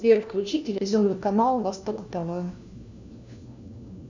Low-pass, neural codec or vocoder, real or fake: 7.2 kHz; codec, 16 kHz, 1 kbps, FunCodec, trained on LibriTTS, 50 frames a second; fake